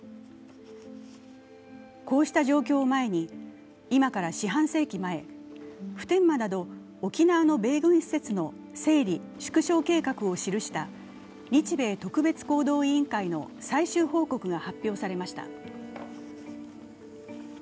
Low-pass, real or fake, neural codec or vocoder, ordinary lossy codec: none; real; none; none